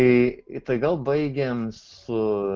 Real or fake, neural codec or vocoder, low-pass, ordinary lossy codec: real; none; 7.2 kHz; Opus, 16 kbps